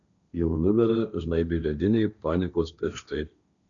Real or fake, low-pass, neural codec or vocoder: fake; 7.2 kHz; codec, 16 kHz, 1.1 kbps, Voila-Tokenizer